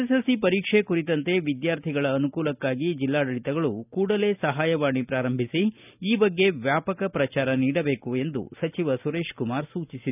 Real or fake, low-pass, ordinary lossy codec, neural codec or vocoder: real; 3.6 kHz; none; none